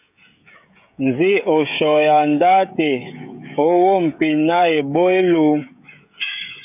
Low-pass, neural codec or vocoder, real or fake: 3.6 kHz; codec, 16 kHz, 16 kbps, FreqCodec, smaller model; fake